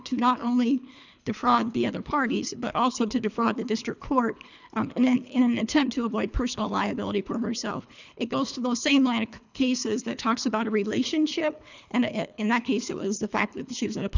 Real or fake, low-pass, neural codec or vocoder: fake; 7.2 kHz; codec, 24 kHz, 3 kbps, HILCodec